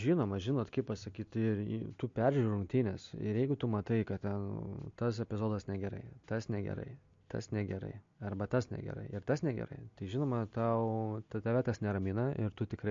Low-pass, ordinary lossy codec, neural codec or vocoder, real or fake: 7.2 kHz; MP3, 48 kbps; none; real